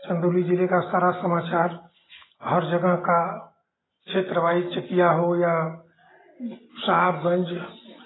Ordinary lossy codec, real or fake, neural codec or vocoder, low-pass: AAC, 16 kbps; real; none; 7.2 kHz